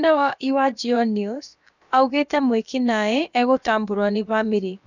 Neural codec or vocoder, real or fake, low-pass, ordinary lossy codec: codec, 16 kHz, about 1 kbps, DyCAST, with the encoder's durations; fake; 7.2 kHz; none